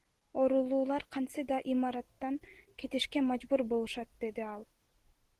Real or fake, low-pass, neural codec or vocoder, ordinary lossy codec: real; 14.4 kHz; none; Opus, 16 kbps